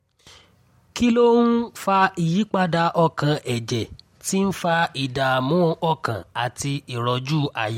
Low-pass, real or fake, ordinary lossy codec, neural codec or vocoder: 19.8 kHz; real; MP3, 64 kbps; none